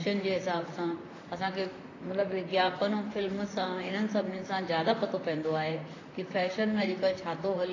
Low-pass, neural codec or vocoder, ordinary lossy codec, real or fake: 7.2 kHz; vocoder, 44.1 kHz, 128 mel bands, Pupu-Vocoder; AAC, 32 kbps; fake